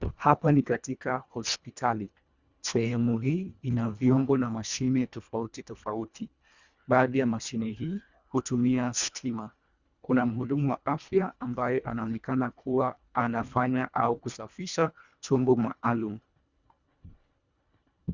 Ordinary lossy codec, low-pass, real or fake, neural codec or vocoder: Opus, 64 kbps; 7.2 kHz; fake; codec, 24 kHz, 1.5 kbps, HILCodec